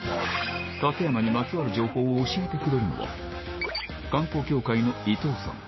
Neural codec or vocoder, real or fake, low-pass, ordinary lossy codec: codec, 16 kHz, 6 kbps, DAC; fake; 7.2 kHz; MP3, 24 kbps